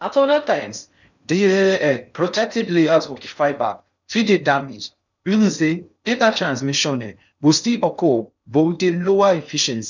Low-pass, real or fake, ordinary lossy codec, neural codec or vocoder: 7.2 kHz; fake; none; codec, 16 kHz in and 24 kHz out, 0.6 kbps, FocalCodec, streaming, 4096 codes